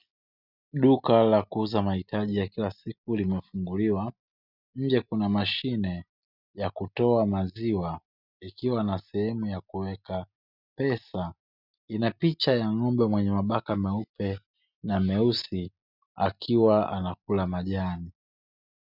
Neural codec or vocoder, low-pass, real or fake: none; 5.4 kHz; real